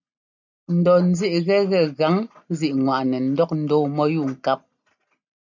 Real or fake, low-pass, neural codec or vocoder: real; 7.2 kHz; none